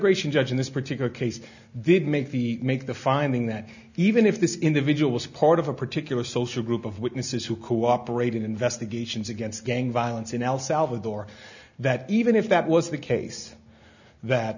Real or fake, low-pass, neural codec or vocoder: real; 7.2 kHz; none